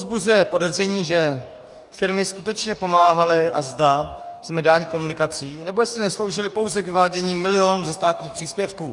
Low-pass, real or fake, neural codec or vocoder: 10.8 kHz; fake; codec, 44.1 kHz, 2.6 kbps, DAC